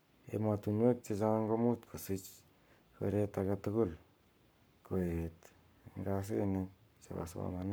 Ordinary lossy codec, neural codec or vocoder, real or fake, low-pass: none; codec, 44.1 kHz, 7.8 kbps, Pupu-Codec; fake; none